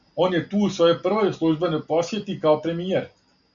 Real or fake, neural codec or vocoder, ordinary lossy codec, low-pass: real; none; MP3, 96 kbps; 7.2 kHz